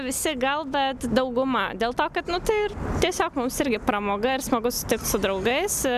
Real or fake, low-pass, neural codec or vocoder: real; 14.4 kHz; none